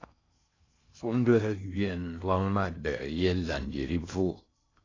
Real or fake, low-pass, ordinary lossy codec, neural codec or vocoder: fake; 7.2 kHz; AAC, 32 kbps; codec, 16 kHz in and 24 kHz out, 0.6 kbps, FocalCodec, streaming, 2048 codes